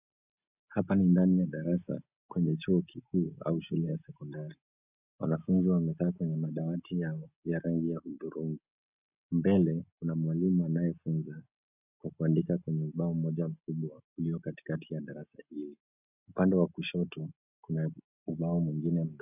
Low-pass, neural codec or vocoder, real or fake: 3.6 kHz; none; real